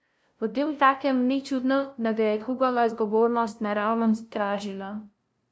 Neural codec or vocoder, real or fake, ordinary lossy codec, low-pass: codec, 16 kHz, 0.5 kbps, FunCodec, trained on LibriTTS, 25 frames a second; fake; none; none